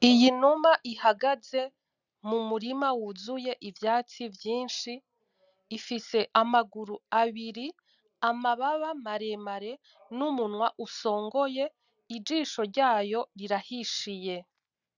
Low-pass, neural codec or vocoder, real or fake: 7.2 kHz; none; real